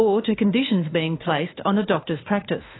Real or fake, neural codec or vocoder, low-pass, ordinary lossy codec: fake; autoencoder, 48 kHz, 32 numbers a frame, DAC-VAE, trained on Japanese speech; 7.2 kHz; AAC, 16 kbps